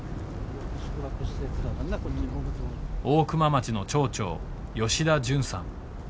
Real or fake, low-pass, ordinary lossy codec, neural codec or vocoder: real; none; none; none